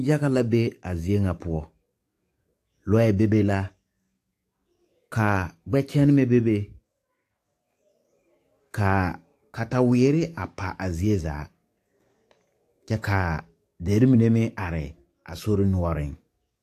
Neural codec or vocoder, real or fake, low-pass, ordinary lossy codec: codec, 44.1 kHz, 7.8 kbps, DAC; fake; 14.4 kHz; AAC, 64 kbps